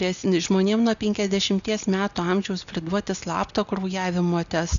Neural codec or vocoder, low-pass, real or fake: none; 7.2 kHz; real